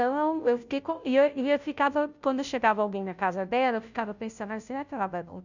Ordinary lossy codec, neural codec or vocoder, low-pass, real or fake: none; codec, 16 kHz, 0.5 kbps, FunCodec, trained on Chinese and English, 25 frames a second; 7.2 kHz; fake